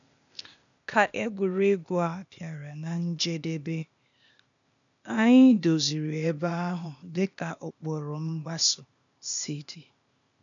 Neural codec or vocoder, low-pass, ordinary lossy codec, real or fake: codec, 16 kHz, 0.8 kbps, ZipCodec; 7.2 kHz; none; fake